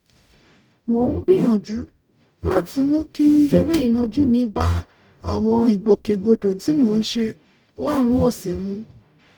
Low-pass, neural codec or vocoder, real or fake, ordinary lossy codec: 19.8 kHz; codec, 44.1 kHz, 0.9 kbps, DAC; fake; none